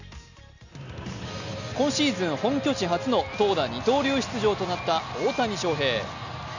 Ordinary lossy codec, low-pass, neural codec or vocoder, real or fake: none; 7.2 kHz; none; real